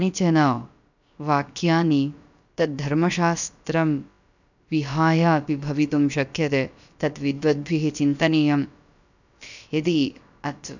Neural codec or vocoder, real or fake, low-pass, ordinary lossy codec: codec, 16 kHz, about 1 kbps, DyCAST, with the encoder's durations; fake; 7.2 kHz; none